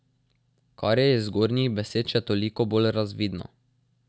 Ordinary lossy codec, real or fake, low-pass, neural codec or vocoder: none; real; none; none